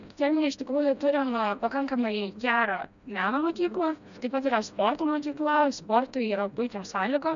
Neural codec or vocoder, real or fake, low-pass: codec, 16 kHz, 1 kbps, FreqCodec, smaller model; fake; 7.2 kHz